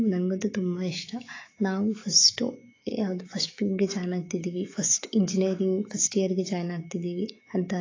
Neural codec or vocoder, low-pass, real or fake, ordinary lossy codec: autoencoder, 48 kHz, 128 numbers a frame, DAC-VAE, trained on Japanese speech; 7.2 kHz; fake; AAC, 32 kbps